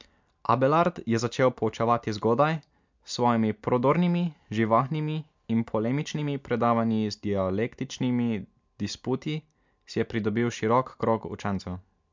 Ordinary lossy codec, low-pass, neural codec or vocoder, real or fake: MP3, 64 kbps; 7.2 kHz; none; real